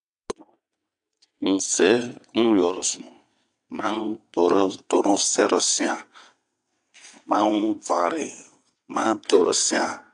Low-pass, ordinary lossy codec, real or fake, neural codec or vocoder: 9.9 kHz; AAC, 64 kbps; fake; vocoder, 22.05 kHz, 80 mel bands, WaveNeXt